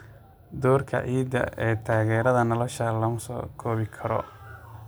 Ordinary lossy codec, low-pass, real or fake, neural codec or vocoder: none; none; real; none